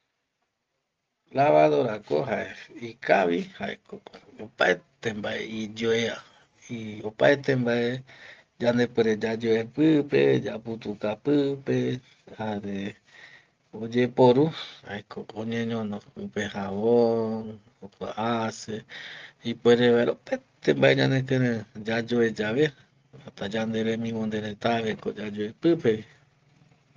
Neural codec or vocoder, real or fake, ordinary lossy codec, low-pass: none; real; Opus, 32 kbps; 7.2 kHz